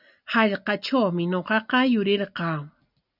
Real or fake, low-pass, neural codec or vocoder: real; 5.4 kHz; none